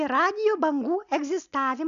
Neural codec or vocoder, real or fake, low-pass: none; real; 7.2 kHz